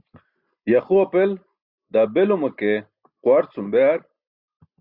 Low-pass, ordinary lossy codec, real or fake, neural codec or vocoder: 5.4 kHz; Opus, 64 kbps; real; none